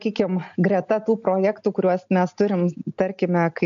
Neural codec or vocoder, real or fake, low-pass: none; real; 7.2 kHz